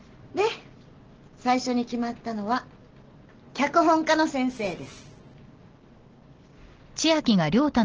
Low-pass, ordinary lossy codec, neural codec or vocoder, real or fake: 7.2 kHz; Opus, 16 kbps; none; real